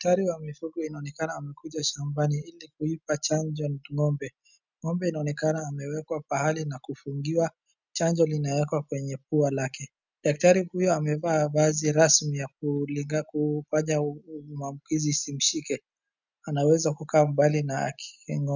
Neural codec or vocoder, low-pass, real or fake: none; 7.2 kHz; real